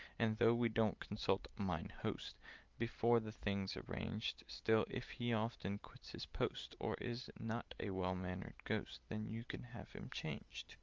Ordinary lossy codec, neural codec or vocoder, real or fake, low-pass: Opus, 32 kbps; none; real; 7.2 kHz